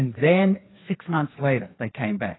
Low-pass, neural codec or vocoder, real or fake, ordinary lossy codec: 7.2 kHz; codec, 16 kHz, 2 kbps, FreqCodec, larger model; fake; AAC, 16 kbps